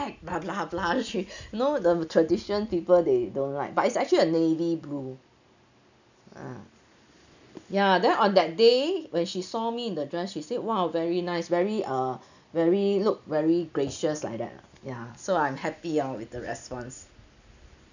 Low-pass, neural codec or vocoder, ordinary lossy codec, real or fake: 7.2 kHz; none; none; real